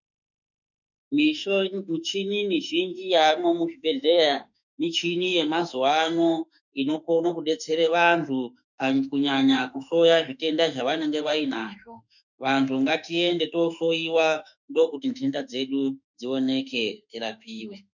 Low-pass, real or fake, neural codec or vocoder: 7.2 kHz; fake; autoencoder, 48 kHz, 32 numbers a frame, DAC-VAE, trained on Japanese speech